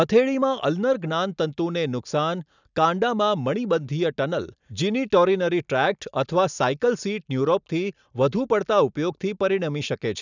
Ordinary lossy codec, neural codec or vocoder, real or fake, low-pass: none; none; real; 7.2 kHz